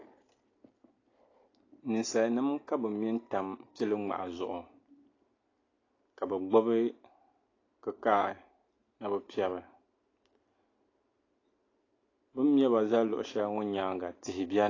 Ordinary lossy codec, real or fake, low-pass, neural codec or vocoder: AAC, 32 kbps; real; 7.2 kHz; none